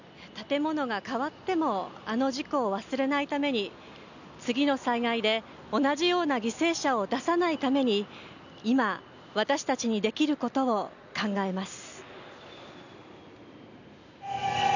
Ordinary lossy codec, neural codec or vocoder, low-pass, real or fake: none; none; 7.2 kHz; real